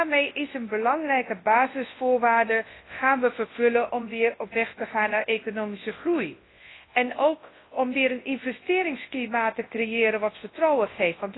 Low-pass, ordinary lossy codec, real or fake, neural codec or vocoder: 7.2 kHz; AAC, 16 kbps; fake; codec, 24 kHz, 0.9 kbps, WavTokenizer, large speech release